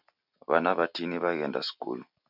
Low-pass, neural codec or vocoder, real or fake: 5.4 kHz; none; real